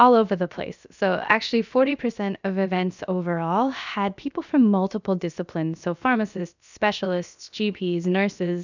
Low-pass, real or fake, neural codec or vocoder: 7.2 kHz; fake; codec, 16 kHz, about 1 kbps, DyCAST, with the encoder's durations